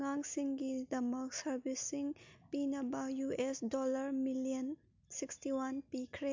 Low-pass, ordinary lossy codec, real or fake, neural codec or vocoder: 7.2 kHz; MP3, 64 kbps; real; none